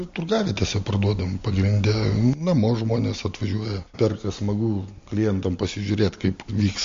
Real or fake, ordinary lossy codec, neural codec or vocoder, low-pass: real; MP3, 48 kbps; none; 7.2 kHz